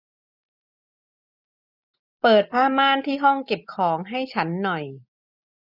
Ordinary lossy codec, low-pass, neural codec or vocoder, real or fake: none; 5.4 kHz; none; real